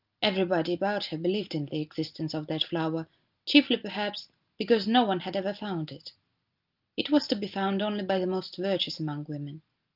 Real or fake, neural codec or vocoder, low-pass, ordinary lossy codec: real; none; 5.4 kHz; Opus, 32 kbps